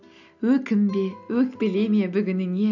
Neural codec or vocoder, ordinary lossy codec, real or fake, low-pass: none; none; real; 7.2 kHz